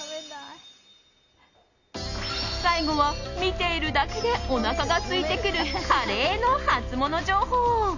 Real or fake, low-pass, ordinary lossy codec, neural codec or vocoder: real; 7.2 kHz; Opus, 64 kbps; none